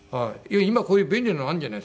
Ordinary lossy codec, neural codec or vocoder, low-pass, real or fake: none; none; none; real